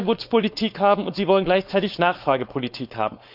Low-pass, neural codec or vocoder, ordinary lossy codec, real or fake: 5.4 kHz; codec, 16 kHz, 4.8 kbps, FACodec; none; fake